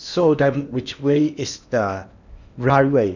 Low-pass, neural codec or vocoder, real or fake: 7.2 kHz; codec, 16 kHz in and 24 kHz out, 0.8 kbps, FocalCodec, streaming, 65536 codes; fake